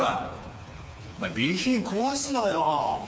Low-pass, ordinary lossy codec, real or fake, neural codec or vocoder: none; none; fake; codec, 16 kHz, 4 kbps, FreqCodec, smaller model